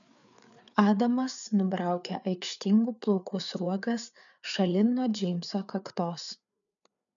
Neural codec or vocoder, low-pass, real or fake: codec, 16 kHz, 4 kbps, FreqCodec, larger model; 7.2 kHz; fake